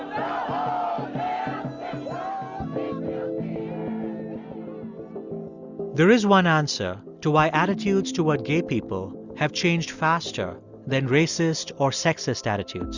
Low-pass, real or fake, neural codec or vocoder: 7.2 kHz; real; none